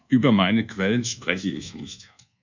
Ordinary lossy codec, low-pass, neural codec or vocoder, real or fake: MP3, 64 kbps; 7.2 kHz; codec, 24 kHz, 1.2 kbps, DualCodec; fake